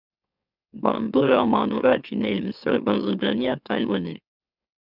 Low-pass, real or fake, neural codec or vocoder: 5.4 kHz; fake; autoencoder, 44.1 kHz, a latent of 192 numbers a frame, MeloTTS